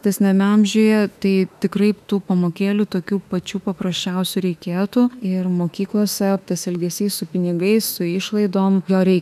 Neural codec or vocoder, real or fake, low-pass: autoencoder, 48 kHz, 32 numbers a frame, DAC-VAE, trained on Japanese speech; fake; 14.4 kHz